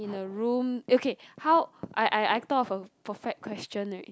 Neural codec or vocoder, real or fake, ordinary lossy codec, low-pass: none; real; none; none